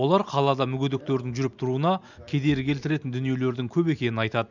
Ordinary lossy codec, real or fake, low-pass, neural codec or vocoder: none; real; 7.2 kHz; none